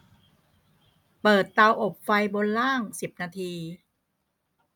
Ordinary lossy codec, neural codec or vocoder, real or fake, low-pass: none; none; real; none